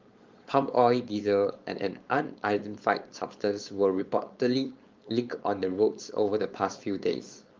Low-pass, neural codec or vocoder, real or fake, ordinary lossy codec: 7.2 kHz; codec, 16 kHz, 4.8 kbps, FACodec; fake; Opus, 32 kbps